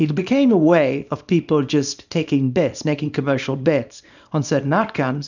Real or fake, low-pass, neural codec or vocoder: fake; 7.2 kHz; codec, 24 kHz, 0.9 kbps, WavTokenizer, small release